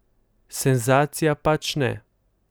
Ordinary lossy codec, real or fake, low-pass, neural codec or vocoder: none; real; none; none